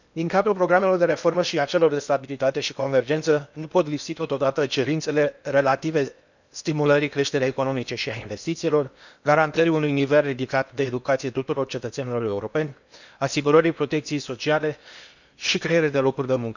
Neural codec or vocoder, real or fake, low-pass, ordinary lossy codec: codec, 16 kHz in and 24 kHz out, 0.8 kbps, FocalCodec, streaming, 65536 codes; fake; 7.2 kHz; none